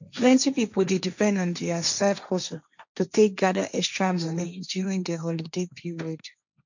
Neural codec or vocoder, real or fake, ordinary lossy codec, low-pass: codec, 16 kHz, 1.1 kbps, Voila-Tokenizer; fake; none; 7.2 kHz